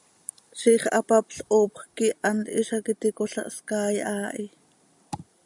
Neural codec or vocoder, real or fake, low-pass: none; real; 10.8 kHz